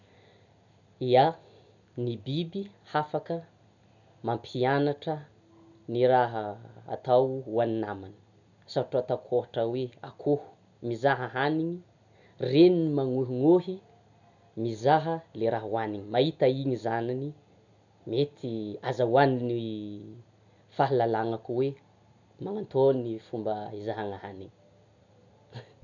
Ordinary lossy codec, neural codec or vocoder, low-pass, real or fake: none; none; 7.2 kHz; real